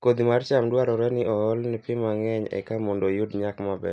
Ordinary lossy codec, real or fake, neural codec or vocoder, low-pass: none; real; none; none